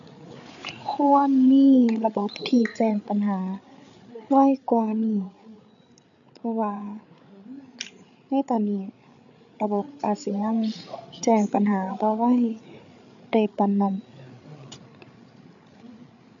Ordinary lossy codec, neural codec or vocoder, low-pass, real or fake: none; codec, 16 kHz, 8 kbps, FreqCodec, larger model; 7.2 kHz; fake